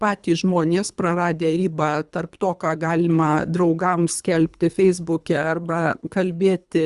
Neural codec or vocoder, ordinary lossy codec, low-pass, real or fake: codec, 24 kHz, 3 kbps, HILCodec; Opus, 64 kbps; 10.8 kHz; fake